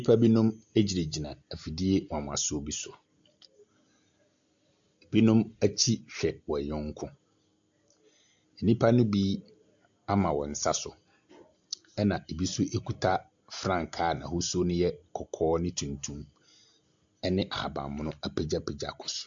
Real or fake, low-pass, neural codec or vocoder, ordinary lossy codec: real; 7.2 kHz; none; AAC, 64 kbps